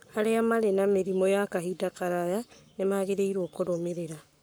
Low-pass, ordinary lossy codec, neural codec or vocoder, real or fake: none; none; codec, 44.1 kHz, 7.8 kbps, Pupu-Codec; fake